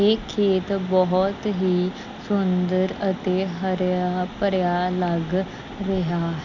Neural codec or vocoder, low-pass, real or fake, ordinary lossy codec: none; 7.2 kHz; real; none